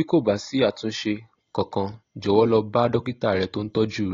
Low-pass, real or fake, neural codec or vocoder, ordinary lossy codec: 7.2 kHz; real; none; AAC, 32 kbps